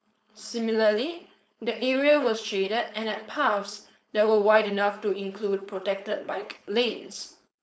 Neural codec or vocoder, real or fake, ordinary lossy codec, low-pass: codec, 16 kHz, 4.8 kbps, FACodec; fake; none; none